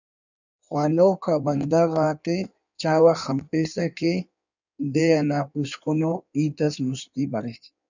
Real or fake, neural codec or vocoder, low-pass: fake; codec, 16 kHz in and 24 kHz out, 1.1 kbps, FireRedTTS-2 codec; 7.2 kHz